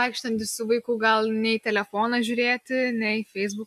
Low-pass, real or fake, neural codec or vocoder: 14.4 kHz; real; none